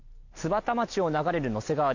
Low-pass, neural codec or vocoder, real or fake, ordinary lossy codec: 7.2 kHz; none; real; none